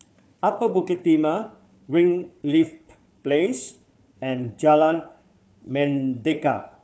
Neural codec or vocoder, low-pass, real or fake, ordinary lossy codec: codec, 16 kHz, 4 kbps, FunCodec, trained on Chinese and English, 50 frames a second; none; fake; none